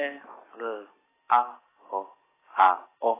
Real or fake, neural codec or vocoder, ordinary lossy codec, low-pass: real; none; AAC, 16 kbps; 3.6 kHz